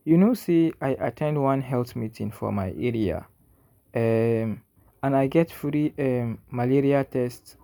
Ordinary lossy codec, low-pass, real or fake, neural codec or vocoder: MP3, 96 kbps; 19.8 kHz; real; none